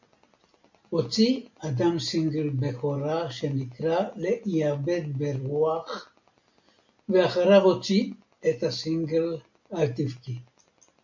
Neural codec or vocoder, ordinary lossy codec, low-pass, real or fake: none; MP3, 48 kbps; 7.2 kHz; real